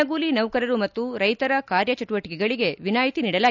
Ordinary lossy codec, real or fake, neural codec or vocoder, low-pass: none; real; none; 7.2 kHz